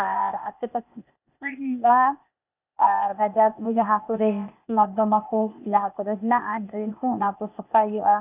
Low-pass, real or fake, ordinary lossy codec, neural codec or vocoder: 3.6 kHz; fake; none; codec, 16 kHz, 0.8 kbps, ZipCodec